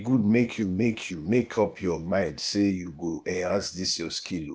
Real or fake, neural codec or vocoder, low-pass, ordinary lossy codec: fake; codec, 16 kHz, 0.8 kbps, ZipCodec; none; none